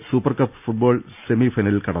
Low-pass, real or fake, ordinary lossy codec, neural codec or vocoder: 3.6 kHz; real; none; none